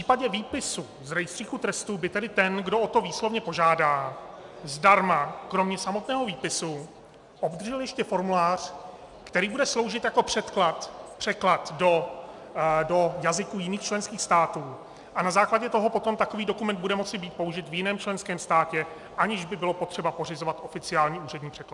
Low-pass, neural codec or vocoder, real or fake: 10.8 kHz; none; real